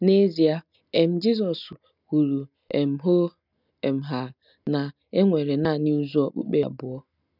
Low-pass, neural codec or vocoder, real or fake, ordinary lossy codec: 5.4 kHz; none; real; none